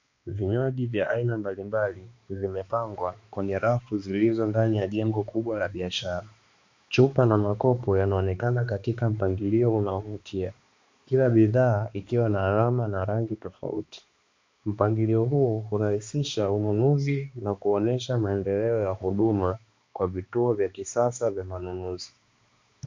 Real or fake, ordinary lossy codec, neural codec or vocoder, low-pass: fake; MP3, 48 kbps; codec, 16 kHz, 2 kbps, X-Codec, HuBERT features, trained on balanced general audio; 7.2 kHz